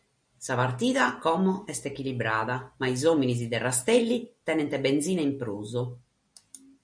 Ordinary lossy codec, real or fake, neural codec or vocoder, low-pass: MP3, 64 kbps; real; none; 9.9 kHz